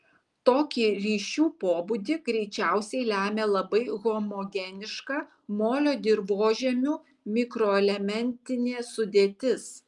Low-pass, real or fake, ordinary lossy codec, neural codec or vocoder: 10.8 kHz; real; Opus, 32 kbps; none